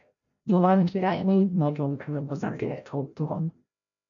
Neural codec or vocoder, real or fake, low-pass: codec, 16 kHz, 0.5 kbps, FreqCodec, larger model; fake; 7.2 kHz